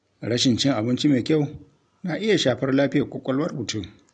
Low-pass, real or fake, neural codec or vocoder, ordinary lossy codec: 9.9 kHz; real; none; none